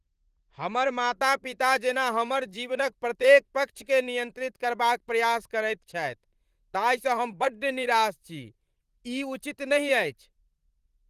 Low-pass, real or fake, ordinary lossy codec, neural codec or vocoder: 14.4 kHz; fake; Opus, 24 kbps; vocoder, 44.1 kHz, 128 mel bands, Pupu-Vocoder